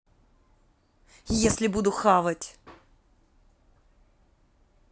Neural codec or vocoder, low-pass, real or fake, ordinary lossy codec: none; none; real; none